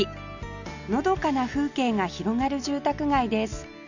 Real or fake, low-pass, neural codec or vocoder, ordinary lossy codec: real; 7.2 kHz; none; none